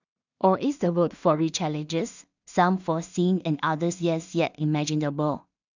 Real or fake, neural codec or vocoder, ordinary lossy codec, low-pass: fake; codec, 16 kHz in and 24 kHz out, 0.4 kbps, LongCat-Audio-Codec, two codebook decoder; none; 7.2 kHz